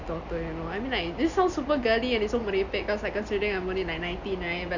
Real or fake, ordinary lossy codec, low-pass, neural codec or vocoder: real; none; 7.2 kHz; none